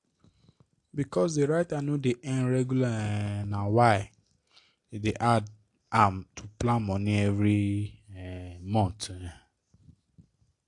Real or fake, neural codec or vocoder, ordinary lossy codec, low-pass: real; none; AAC, 48 kbps; 10.8 kHz